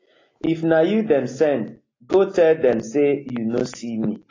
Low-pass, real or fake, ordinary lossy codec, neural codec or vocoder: 7.2 kHz; real; AAC, 32 kbps; none